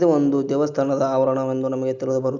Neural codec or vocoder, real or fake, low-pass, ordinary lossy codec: none; real; 7.2 kHz; Opus, 64 kbps